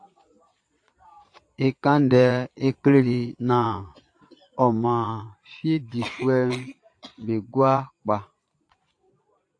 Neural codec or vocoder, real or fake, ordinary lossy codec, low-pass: vocoder, 22.05 kHz, 80 mel bands, Vocos; fake; AAC, 48 kbps; 9.9 kHz